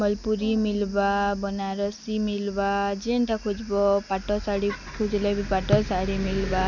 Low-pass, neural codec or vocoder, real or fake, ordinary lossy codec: 7.2 kHz; none; real; none